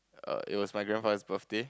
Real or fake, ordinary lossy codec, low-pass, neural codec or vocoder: real; none; none; none